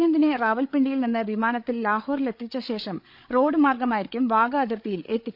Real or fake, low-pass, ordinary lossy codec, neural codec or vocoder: fake; 5.4 kHz; none; codec, 16 kHz, 8 kbps, FreqCodec, larger model